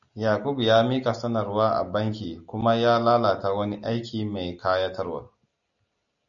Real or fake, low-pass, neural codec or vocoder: real; 7.2 kHz; none